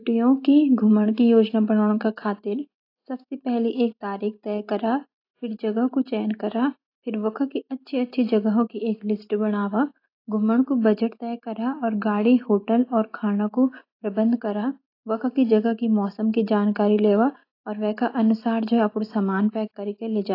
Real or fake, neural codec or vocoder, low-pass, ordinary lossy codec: real; none; 5.4 kHz; AAC, 32 kbps